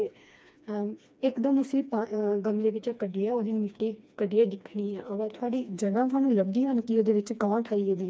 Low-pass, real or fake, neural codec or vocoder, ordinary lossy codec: none; fake; codec, 16 kHz, 2 kbps, FreqCodec, smaller model; none